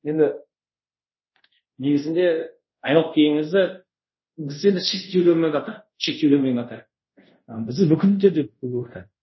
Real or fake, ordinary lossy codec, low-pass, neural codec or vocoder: fake; MP3, 24 kbps; 7.2 kHz; codec, 24 kHz, 0.5 kbps, DualCodec